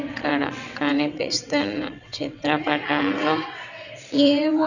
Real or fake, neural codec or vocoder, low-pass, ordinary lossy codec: fake; vocoder, 22.05 kHz, 80 mel bands, WaveNeXt; 7.2 kHz; none